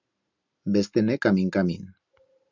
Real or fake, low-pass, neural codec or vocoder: real; 7.2 kHz; none